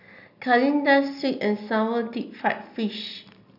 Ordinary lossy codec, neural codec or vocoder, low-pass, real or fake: none; none; 5.4 kHz; real